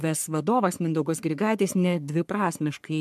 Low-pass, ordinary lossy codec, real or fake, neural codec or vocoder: 14.4 kHz; MP3, 96 kbps; fake; codec, 44.1 kHz, 3.4 kbps, Pupu-Codec